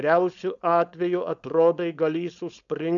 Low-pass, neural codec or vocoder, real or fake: 7.2 kHz; codec, 16 kHz, 4.8 kbps, FACodec; fake